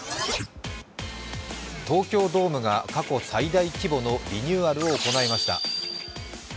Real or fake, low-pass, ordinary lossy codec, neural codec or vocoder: real; none; none; none